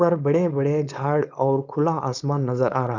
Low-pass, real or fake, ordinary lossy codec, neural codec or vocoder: 7.2 kHz; fake; none; codec, 16 kHz, 4.8 kbps, FACodec